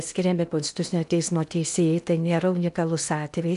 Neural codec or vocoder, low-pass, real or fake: codec, 16 kHz in and 24 kHz out, 0.8 kbps, FocalCodec, streaming, 65536 codes; 10.8 kHz; fake